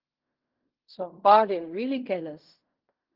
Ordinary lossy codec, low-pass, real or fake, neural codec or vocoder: Opus, 32 kbps; 5.4 kHz; fake; codec, 16 kHz in and 24 kHz out, 0.4 kbps, LongCat-Audio-Codec, fine tuned four codebook decoder